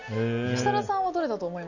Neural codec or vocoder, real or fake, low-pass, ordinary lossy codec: none; real; 7.2 kHz; none